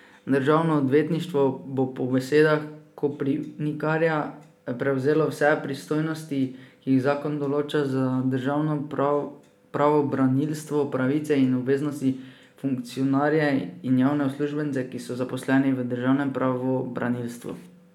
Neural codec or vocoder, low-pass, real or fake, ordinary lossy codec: none; 19.8 kHz; real; none